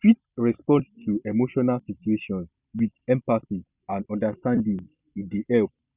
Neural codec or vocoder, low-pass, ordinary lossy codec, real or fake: none; 3.6 kHz; Opus, 64 kbps; real